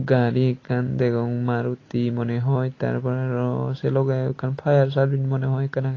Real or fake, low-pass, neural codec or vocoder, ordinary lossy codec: real; 7.2 kHz; none; AAC, 32 kbps